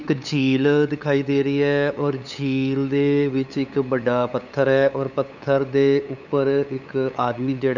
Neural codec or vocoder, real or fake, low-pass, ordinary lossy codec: codec, 16 kHz, 8 kbps, FunCodec, trained on LibriTTS, 25 frames a second; fake; 7.2 kHz; none